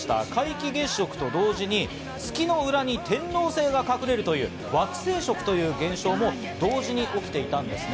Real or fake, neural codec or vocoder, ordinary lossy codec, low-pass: real; none; none; none